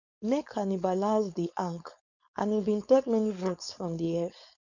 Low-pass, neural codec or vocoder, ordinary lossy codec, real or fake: 7.2 kHz; codec, 16 kHz, 4.8 kbps, FACodec; none; fake